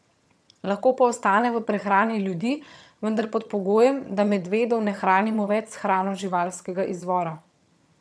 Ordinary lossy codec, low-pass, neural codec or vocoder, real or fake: none; none; vocoder, 22.05 kHz, 80 mel bands, HiFi-GAN; fake